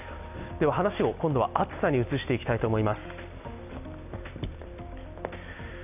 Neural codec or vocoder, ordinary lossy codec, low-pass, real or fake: none; none; 3.6 kHz; real